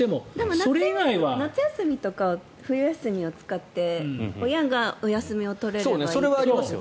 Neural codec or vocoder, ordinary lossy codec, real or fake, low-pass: none; none; real; none